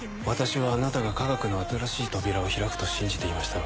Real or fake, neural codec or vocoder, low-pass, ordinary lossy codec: real; none; none; none